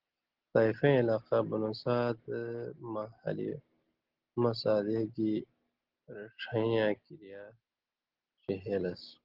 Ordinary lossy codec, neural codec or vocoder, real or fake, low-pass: Opus, 16 kbps; none; real; 5.4 kHz